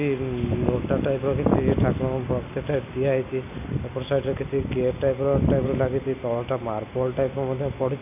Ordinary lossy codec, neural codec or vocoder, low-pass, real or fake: none; none; 3.6 kHz; real